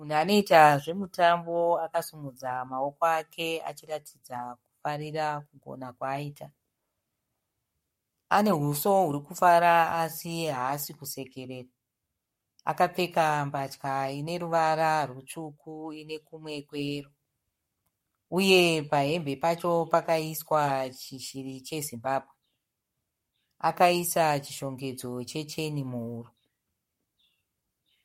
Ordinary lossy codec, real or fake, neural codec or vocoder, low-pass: MP3, 64 kbps; fake; codec, 44.1 kHz, 7.8 kbps, Pupu-Codec; 19.8 kHz